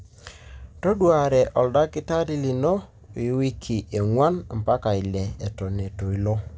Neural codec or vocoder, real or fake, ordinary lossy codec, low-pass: none; real; none; none